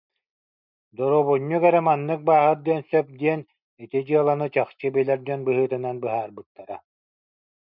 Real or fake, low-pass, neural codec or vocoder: real; 5.4 kHz; none